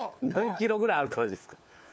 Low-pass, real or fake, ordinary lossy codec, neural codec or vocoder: none; fake; none; codec, 16 kHz, 4 kbps, FunCodec, trained on LibriTTS, 50 frames a second